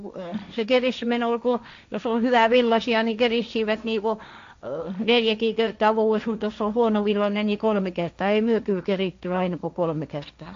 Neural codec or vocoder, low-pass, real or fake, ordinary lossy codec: codec, 16 kHz, 1.1 kbps, Voila-Tokenizer; 7.2 kHz; fake; AAC, 64 kbps